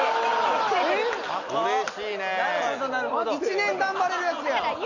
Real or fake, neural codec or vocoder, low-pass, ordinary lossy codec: real; none; 7.2 kHz; none